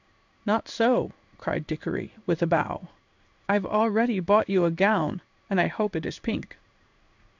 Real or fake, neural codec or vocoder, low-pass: fake; codec, 16 kHz in and 24 kHz out, 1 kbps, XY-Tokenizer; 7.2 kHz